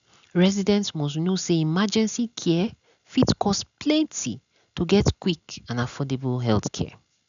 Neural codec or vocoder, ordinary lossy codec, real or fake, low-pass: none; none; real; 7.2 kHz